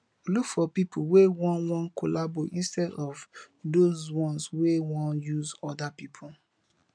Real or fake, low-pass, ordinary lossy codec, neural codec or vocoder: real; 9.9 kHz; none; none